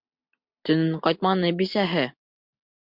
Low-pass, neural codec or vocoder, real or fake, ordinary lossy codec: 5.4 kHz; none; real; MP3, 48 kbps